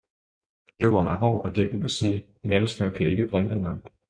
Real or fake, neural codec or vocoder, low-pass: fake; codec, 16 kHz in and 24 kHz out, 1.1 kbps, FireRedTTS-2 codec; 9.9 kHz